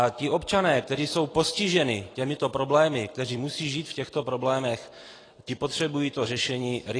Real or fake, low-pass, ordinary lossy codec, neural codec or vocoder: real; 9.9 kHz; AAC, 32 kbps; none